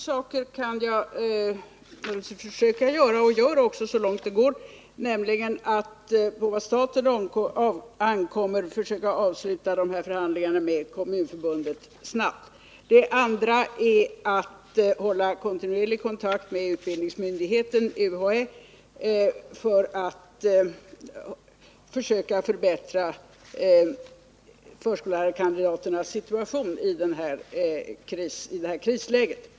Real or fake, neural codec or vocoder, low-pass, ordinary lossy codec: real; none; none; none